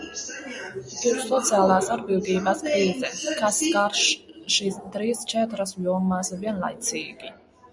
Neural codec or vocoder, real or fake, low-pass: none; real; 10.8 kHz